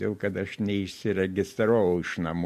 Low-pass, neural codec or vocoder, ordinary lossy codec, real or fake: 14.4 kHz; none; MP3, 64 kbps; real